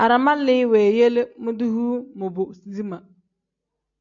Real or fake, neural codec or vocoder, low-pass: real; none; 7.2 kHz